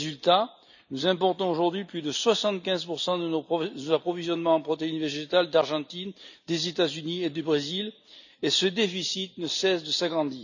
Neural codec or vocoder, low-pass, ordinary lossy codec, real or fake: none; 7.2 kHz; none; real